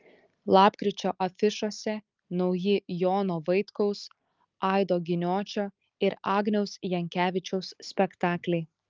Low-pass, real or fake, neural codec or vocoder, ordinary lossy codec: 7.2 kHz; real; none; Opus, 32 kbps